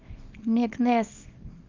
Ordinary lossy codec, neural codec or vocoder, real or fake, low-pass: Opus, 32 kbps; codec, 24 kHz, 0.9 kbps, WavTokenizer, small release; fake; 7.2 kHz